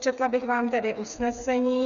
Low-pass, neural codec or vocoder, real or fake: 7.2 kHz; codec, 16 kHz, 4 kbps, FreqCodec, smaller model; fake